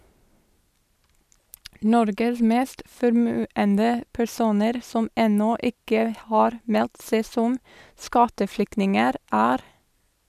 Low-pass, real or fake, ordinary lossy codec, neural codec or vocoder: 14.4 kHz; real; none; none